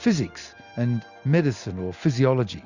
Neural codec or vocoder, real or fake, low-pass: none; real; 7.2 kHz